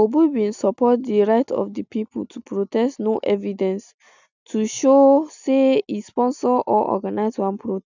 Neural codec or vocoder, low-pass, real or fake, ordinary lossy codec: none; 7.2 kHz; real; none